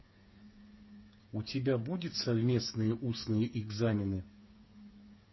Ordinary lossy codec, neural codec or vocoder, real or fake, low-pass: MP3, 24 kbps; codec, 16 kHz, 8 kbps, FreqCodec, smaller model; fake; 7.2 kHz